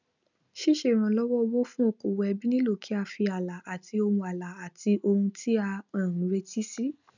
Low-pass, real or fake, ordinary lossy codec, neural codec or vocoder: 7.2 kHz; real; none; none